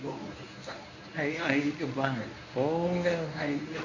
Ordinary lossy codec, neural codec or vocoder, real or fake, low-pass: none; codec, 24 kHz, 0.9 kbps, WavTokenizer, medium speech release version 1; fake; 7.2 kHz